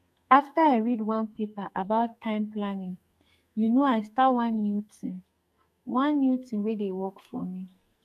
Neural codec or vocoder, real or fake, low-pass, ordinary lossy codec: codec, 44.1 kHz, 2.6 kbps, SNAC; fake; 14.4 kHz; none